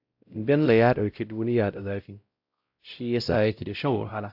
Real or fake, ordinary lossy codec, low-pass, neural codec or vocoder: fake; AAC, 48 kbps; 5.4 kHz; codec, 16 kHz, 0.5 kbps, X-Codec, WavLM features, trained on Multilingual LibriSpeech